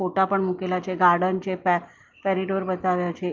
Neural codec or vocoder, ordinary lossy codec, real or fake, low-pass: none; Opus, 24 kbps; real; 7.2 kHz